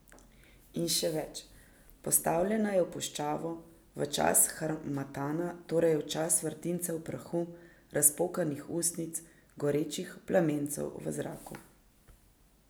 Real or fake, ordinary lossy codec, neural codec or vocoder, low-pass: real; none; none; none